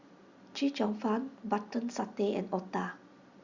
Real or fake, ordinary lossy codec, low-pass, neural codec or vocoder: real; Opus, 64 kbps; 7.2 kHz; none